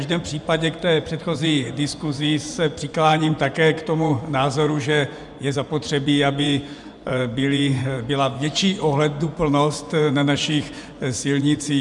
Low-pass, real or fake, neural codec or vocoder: 10.8 kHz; fake; vocoder, 24 kHz, 100 mel bands, Vocos